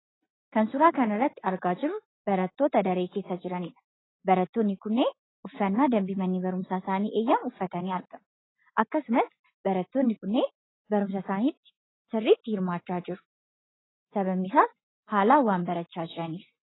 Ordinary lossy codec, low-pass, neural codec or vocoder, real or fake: AAC, 16 kbps; 7.2 kHz; none; real